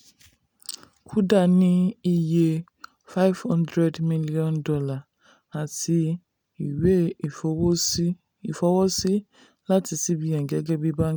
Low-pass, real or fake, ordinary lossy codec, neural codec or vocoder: none; real; none; none